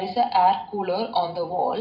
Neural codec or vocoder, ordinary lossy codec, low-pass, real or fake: none; none; 5.4 kHz; real